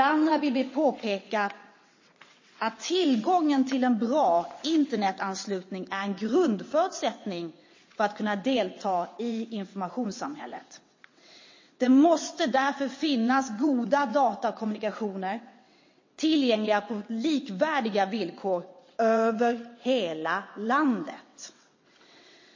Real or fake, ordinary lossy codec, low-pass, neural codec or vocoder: fake; MP3, 32 kbps; 7.2 kHz; vocoder, 22.05 kHz, 80 mel bands, WaveNeXt